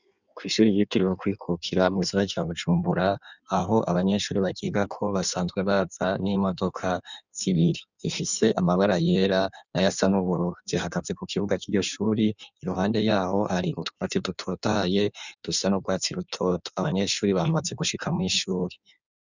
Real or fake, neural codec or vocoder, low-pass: fake; codec, 16 kHz in and 24 kHz out, 1.1 kbps, FireRedTTS-2 codec; 7.2 kHz